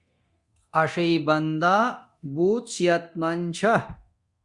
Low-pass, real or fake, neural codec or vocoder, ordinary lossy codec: 10.8 kHz; fake; codec, 24 kHz, 0.9 kbps, DualCodec; Opus, 64 kbps